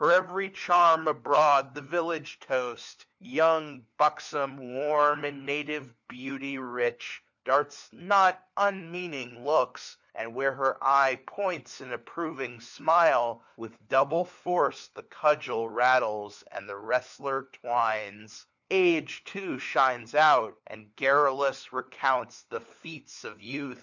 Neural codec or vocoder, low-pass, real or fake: codec, 16 kHz, 4 kbps, FunCodec, trained on LibriTTS, 50 frames a second; 7.2 kHz; fake